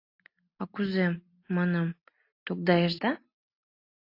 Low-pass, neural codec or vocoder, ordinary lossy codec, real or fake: 5.4 kHz; none; AAC, 32 kbps; real